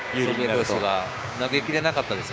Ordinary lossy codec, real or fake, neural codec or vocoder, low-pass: none; fake; codec, 16 kHz, 6 kbps, DAC; none